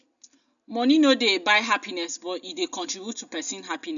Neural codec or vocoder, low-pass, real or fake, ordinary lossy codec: none; 7.2 kHz; real; none